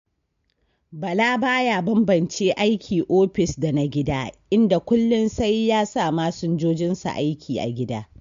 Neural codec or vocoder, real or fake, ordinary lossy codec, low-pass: none; real; MP3, 48 kbps; 7.2 kHz